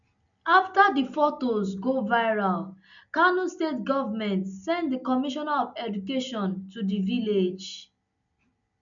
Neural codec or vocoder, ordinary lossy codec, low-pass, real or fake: none; none; 7.2 kHz; real